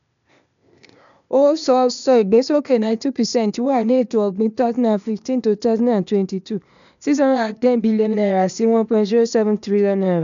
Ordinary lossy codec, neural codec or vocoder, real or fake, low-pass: none; codec, 16 kHz, 0.8 kbps, ZipCodec; fake; 7.2 kHz